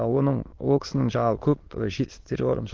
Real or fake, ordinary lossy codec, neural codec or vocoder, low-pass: fake; Opus, 16 kbps; autoencoder, 22.05 kHz, a latent of 192 numbers a frame, VITS, trained on many speakers; 7.2 kHz